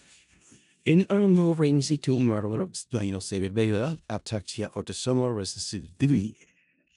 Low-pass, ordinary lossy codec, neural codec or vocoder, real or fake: 10.8 kHz; none; codec, 16 kHz in and 24 kHz out, 0.4 kbps, LongCat-Audio-Codec, four codebook decoder; fake